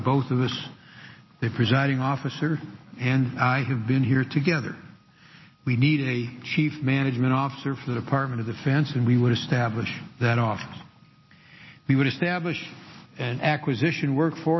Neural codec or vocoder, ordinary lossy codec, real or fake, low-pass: none; MP3, 24 kbps; real; 7.2 kHz